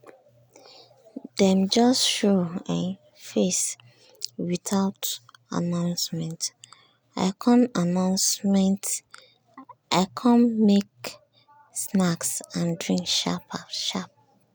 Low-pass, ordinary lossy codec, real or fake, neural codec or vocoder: none; none; real; none